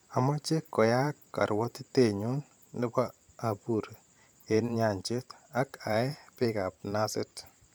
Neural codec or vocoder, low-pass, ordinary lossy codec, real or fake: vocoder, 44.1 kHz, 128 mel bands every 512 samples, BigVGAN v2; none; none; fake